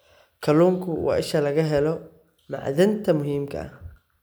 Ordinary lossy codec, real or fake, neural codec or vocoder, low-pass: none; real; none; none